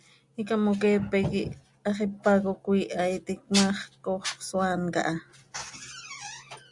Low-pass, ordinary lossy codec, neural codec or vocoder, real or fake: 10.8 kHz; Opus, 64 kbps; none; real